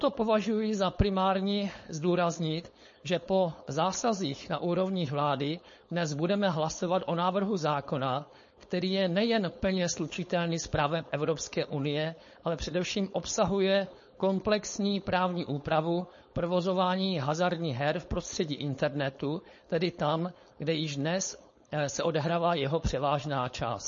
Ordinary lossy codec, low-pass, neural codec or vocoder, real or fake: MP3, 32 kbps; 7.2 kHz; codec, 16 kHz, 4.8 kbps, FACodec; fake